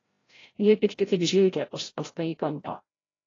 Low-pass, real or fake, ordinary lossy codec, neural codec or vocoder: 7.2 kHz; fake; AAC, 32 kbps; codec, 16 kHz, 0.5 kbps, FreqCodec, larger model